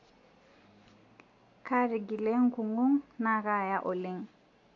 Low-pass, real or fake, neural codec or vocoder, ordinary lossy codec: 7.2 kHz; real; none; AAC, 48 kbps